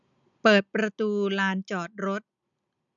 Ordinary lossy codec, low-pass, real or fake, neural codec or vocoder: none; 7.2 kHz; real; none